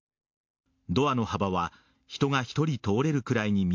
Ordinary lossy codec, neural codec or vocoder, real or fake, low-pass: MP3, 48 kbps; none; real; 7.2 kHz